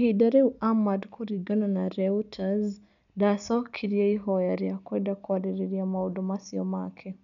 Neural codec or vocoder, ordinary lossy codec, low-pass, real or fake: none; none; 7.2 kHz; real